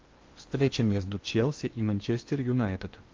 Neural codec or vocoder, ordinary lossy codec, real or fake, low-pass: codec, 16 kHz in and 24 kHz out, 0.8 kbps, FocalCodec, streaming, 65536 codes; Opus, 32 kbps; fake; 7.2 kHz